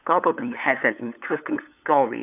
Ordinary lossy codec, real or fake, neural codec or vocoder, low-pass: none; fake; codec, 16 kHz, 8 kbps, FunCodec, trained on LibriTTS, 25 frames a second; 3.6 kHz